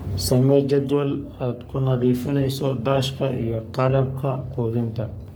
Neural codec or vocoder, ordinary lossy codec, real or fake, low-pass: codec, 44.1 kHz, 3.4 kbps, Pupu-Codec; none; fake; none